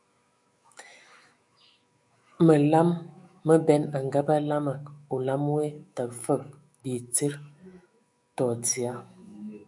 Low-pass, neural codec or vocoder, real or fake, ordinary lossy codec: 10.8 kHz; autoencoder, 48 kHz, 128 numbers a frame, DAC-VAE, trained on Japanese speech; fake; MP3, 96 kbps